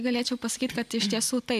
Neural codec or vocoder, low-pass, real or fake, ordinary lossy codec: none; 14.4 kHz; real; MP3, 96 kbps